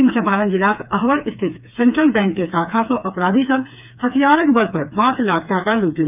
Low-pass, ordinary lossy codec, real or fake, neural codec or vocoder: 3.6 kHz; none; fake; codec, 16 kHz, 4 kbps, FreqCodec, smaller model